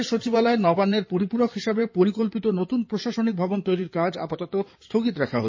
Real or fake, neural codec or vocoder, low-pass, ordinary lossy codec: fake; codec, 16 kHz in and 24 kHz out, 2.2 kbps, FireRedTTS-2 codec; 7.2 kHz; MP3, 32 kbps